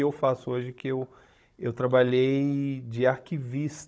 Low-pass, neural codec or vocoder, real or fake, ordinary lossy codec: none; codec, 16 kHz, 16 kbps, FunCodec, trained on Chinese and English, 50 frames a second; fake; none